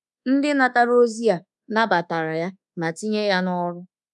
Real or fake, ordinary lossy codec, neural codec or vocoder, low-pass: fake; none; codec, 24 kHz, 1.2 kbps, DualCodec; none